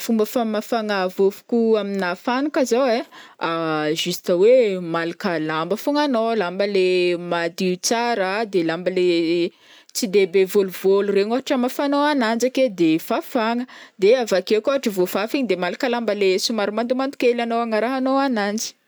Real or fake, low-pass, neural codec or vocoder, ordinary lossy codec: real; none; none; none